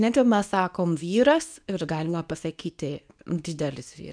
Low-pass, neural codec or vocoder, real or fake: 9.9 kHz; codec, 24 kHz, 0.9 kbps, WavTokenizer, medium speech release version 2; fake